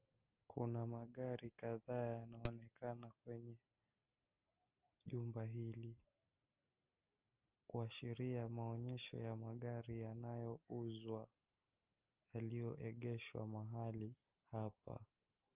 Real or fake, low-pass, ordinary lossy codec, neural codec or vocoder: real; 3.6 kHz; Opus, 24 kbps; none